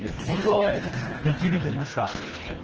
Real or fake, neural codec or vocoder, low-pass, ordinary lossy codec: fake; codec, 24 kHz, 1.5 kbps, HILCodec; 7.2 kHz; Opus, 16 kbps